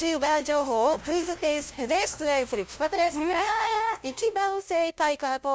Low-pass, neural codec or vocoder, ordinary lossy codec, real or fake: none; codec, 16 kHz, 0.5 kbps, FunCodec, trained on LibriTTS, 25 frames a second; none; fake